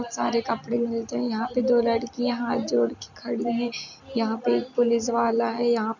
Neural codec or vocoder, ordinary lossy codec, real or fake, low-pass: none; none; real; 7.2 kHz